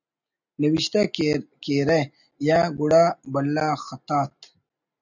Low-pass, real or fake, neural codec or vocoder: 7.2 kHz; real; none